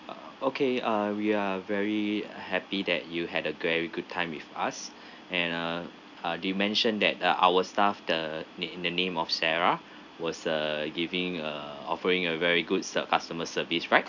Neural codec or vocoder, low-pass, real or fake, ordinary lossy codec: none; 7.2 kHz; real; none